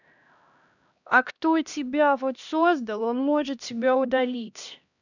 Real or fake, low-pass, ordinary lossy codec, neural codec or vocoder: fake; 7.2 kHz; none; codec, 16 kHz, 1 kbps, X-Codec, HuBERT features, trained on LibriSpeech